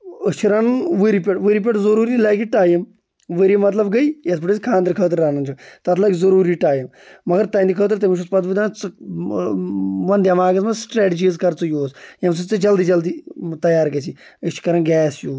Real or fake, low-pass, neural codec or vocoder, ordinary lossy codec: real; none; none; none